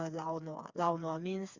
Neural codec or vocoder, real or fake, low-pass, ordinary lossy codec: codec, 16 kHz, 4 kbps, FreqCodec, smaller model; fake; 7.2 kHz; Opus, 64 kbps